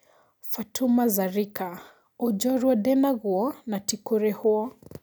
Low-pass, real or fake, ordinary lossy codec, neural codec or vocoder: none; real; none; none